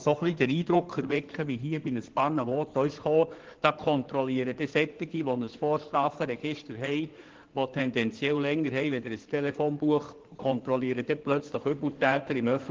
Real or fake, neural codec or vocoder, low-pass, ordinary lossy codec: fake; codec, 16 kHz in and 24 kHz out, 2.2 kbps, FireRedTTS-2 codec; 7.2 kHz; Opus, 16 kbps